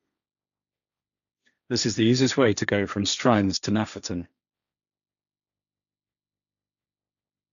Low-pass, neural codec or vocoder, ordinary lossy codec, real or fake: 7.2 kHz; codec, 16 kHz, 1.1 kbps, Voila-Tokenizer; none; fake